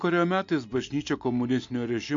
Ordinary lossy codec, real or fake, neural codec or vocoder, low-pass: MP3, 48 kbps; real; none; 7.2 kHz